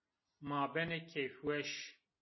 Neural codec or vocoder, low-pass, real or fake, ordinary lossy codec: none; 7.2 kHz; real; MP3, 24 kbps